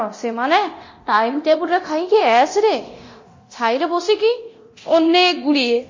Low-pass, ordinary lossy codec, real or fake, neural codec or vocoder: 7.2 kHz; MP3, 32 kbps; fake; codec, 24 kHz, 0.9 kbps, DualCodec